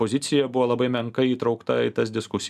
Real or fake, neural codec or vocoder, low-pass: real; none; 14.4 kHz